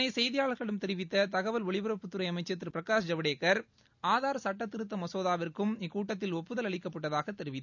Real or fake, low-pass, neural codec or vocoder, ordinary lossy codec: real; 7.2 kHz; none; none